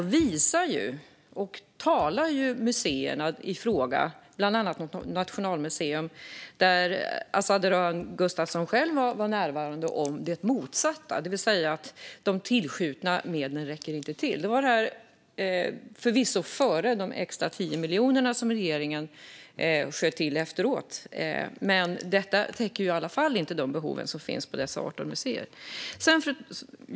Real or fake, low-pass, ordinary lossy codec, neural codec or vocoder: real; none; none; none